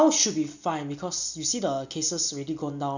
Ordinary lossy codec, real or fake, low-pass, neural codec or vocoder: none; real; 7.2 kHz; none